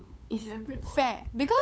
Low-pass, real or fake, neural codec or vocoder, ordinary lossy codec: none; fake; codec, 16 kHz, 8 kbps, FunCodec, trained on LibriTTS, 25 frames a second; none